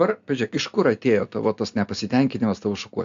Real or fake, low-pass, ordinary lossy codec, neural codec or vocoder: real; 7.2 kHz; AAC, 64 kbps; none